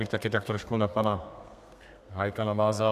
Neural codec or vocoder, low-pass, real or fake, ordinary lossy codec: codec, 32 kHz, 1.9 kbps, SNAC; 14.4 kHz; fake; AAC, 96 kbps